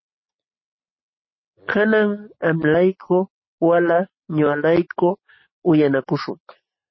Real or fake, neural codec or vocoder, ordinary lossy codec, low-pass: real; none; MP3, 24 kbps; 7.2 kHz